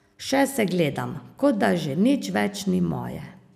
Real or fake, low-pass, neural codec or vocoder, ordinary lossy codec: real; 14.4 kHz; none; none